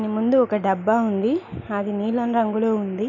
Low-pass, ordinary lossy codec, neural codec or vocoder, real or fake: 7.2 kHz; none; none; real